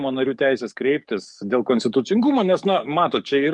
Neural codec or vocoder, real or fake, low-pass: codec, 44.1 kHz, 7.8 kbps, DAC; fake; 10.8 kHz